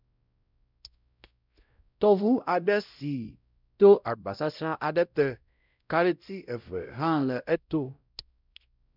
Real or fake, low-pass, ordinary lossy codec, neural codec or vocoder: fake; 5.4 kHz; none; codec, 16 kHz, 0.5 kbps, X-Codec, WavLM features, trained on Multilingual LibriSpeech